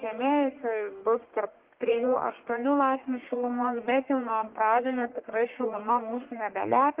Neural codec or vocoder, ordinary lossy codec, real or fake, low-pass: codec, 44.1 kHz, 1.7 kbps, Pupu-Codec; Opus, 16 kbps; fake; 3.6 kHz